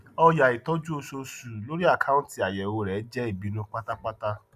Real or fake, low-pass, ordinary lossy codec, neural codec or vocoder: real; 14.4 kHz; none; none